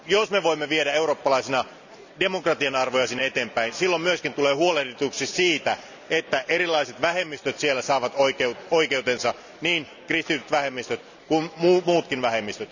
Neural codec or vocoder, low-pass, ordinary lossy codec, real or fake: none; 7.2 kHz; none; real